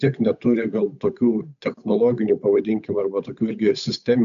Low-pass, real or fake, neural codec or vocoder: 7.2 kHz; fake; codec, 16 kHz, 8 kbps, FunCodec, trained on Chinese and English, 25 frames a second